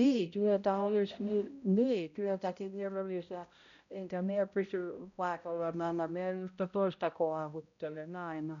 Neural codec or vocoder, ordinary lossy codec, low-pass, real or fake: codec, 16 kHz, 0.5 kbps, X-Codec, HuBERT features, trained on balanced general audio; none; 7.2 kHz; fake